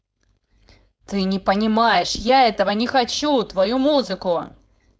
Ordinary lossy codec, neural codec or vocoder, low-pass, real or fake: none; codec, 16 kHz, 4.8 kbps, FACodec; none; fake